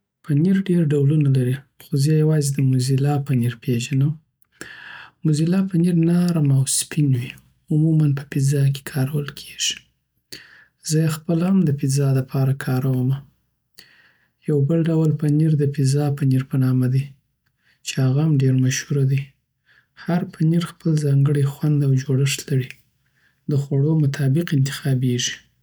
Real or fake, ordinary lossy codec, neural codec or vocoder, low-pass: real; none; none; none